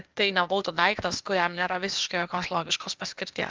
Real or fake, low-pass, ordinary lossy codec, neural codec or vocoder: fake; 7.2 kHz; Opus, 24 kbps; codec, 16 kHz, 0.8 kbps, ZipCodec